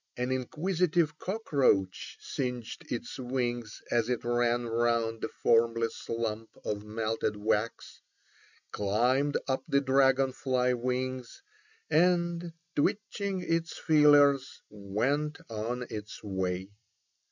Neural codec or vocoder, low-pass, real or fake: none; 7.2 kHz; real